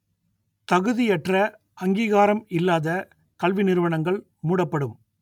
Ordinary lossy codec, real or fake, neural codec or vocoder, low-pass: none; real; none; 19.8 kHz